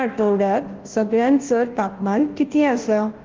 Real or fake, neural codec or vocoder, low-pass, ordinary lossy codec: fake; codec, 16 kHz, 0.5 kbps, FunCodec, trained on Chinese and English, 25 frames a second; 7.2 kHz; Opus, 16 kbps